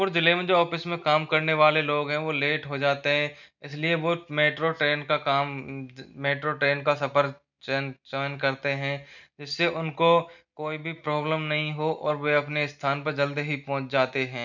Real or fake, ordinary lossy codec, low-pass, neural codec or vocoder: real; none; 7.2 kHz; none